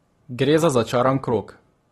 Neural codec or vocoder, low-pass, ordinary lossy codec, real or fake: none; 19.8 kHz; AAC, 32 kbps; real